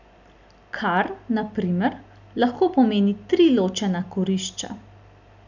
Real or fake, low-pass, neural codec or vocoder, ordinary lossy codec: real; 7.2 kHz; none; none